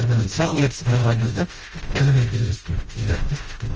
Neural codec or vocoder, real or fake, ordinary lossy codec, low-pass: codec, 16 kHz, 0.5 kbps, FreqCodec, smaller model; fake; Opus, 24 kbps; 7.2 kHz